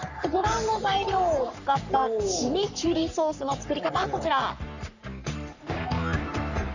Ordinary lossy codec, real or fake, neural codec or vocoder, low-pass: none; fake; codec, 44.1 kHz, 3.4 kbps, Pupu-Codec; 7.2 kHz